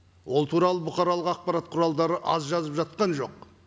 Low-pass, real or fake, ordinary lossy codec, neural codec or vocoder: none; real; none; none